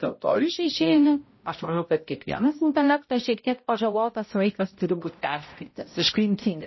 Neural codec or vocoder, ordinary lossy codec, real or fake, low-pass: codec, 16 kHz, 0.5 kbps, X-Codec, HuBERT features, trained on balanced general audio; MP3, 24 kbps; fake; 7.2 kHz